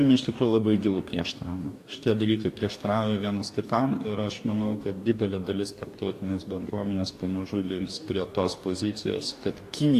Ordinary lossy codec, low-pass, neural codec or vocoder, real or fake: AAC, 64 kbps; 14.4 kHz; codec, 44.1 kHz, 2.6 kbps, DAC; fake